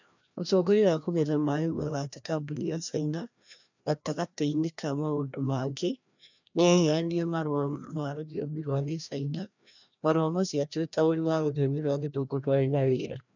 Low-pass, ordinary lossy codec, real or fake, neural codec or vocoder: 7.2 kHz; none; fake; codec, 16 kHz, 1 kbps, FreqCodec, larger model